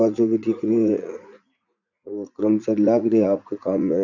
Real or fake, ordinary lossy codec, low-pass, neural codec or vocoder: fake; none; 7.2 kHz; vocoder, 44.1 kHz, 80 mel bands, Vocos